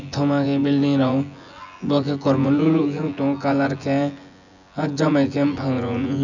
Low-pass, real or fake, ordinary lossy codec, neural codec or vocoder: 7.2 kHz; fake; none; vocoder, 24 kHz, 100 mel bands, Vocos